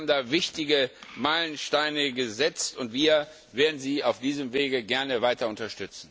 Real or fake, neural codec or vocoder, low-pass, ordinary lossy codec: real; none; none; none